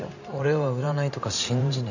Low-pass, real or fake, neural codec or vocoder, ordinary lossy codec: 7.2 kHz; fake; vocoder, 44.1 kHz, 128 mel bands every 512 samples, BigVGAN v2; none